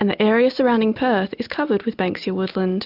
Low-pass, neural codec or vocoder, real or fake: 5.4 kHz; none; real